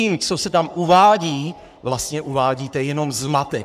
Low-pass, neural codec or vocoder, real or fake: 14.4 kHz; codec, 44.1 kHz, 3.4 kbps, Pupu-Codec; fake